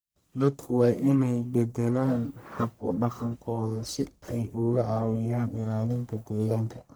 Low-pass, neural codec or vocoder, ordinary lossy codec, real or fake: none; codec, 44.1 kHz, 1.7 kbps, Pupu-Codec; none; fake